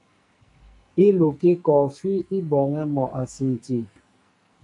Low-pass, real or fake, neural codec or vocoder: 10.8 kHz; fake; codec, 44.1 kHz, 2.6 kbps, SNAC